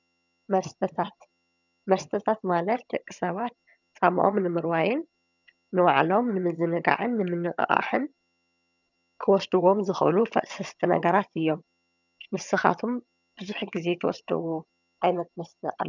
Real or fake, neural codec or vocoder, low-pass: fake; vocoder, 22.05 kHz, 80 mel bands, HiFi-GAN; 7.2 kHz